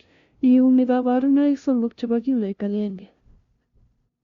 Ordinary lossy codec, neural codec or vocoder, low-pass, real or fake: Opus, 64 kbps; codec, 16 kHz, 0.5 kbps, FunCodec, trained on LibriTTS, 25 frames a second; 7.2 kHz; fake